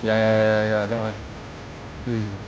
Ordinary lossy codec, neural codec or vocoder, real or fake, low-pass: none; codec, 16 kHz, 0.5 kbps, FunCodec, trained on Chinese and English, 25 frames a second; fake; none